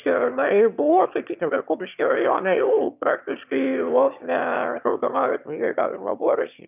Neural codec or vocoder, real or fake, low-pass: autoencoder, 22.05 kHz, a latent of 192 numbers a frame, VITS, trained on one speaker; fake; 3.6 kHz